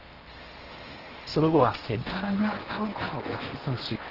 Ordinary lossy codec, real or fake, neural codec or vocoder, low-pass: Opus, 16 kbps; fake; codec, 16 kHz in and 24 kHz out, 0.8 kbps, FocalCodec, streaming, 65536 codes; 5.4 kHz